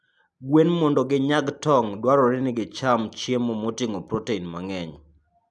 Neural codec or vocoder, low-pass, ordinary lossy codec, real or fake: none; none; none; real